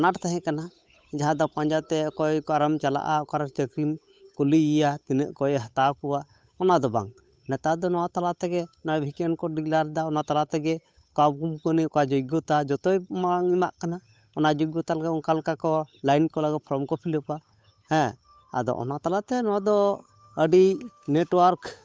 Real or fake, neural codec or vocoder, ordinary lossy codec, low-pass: fake; codec, 16 kHz, 8 kbps, FunCodec, trained on Chinese and English, 25 frames a second; none; none